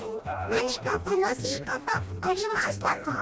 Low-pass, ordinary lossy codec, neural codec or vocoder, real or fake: none; none; codec, 16 kHz, 1 kbps, FreqCodec, smaller model; fake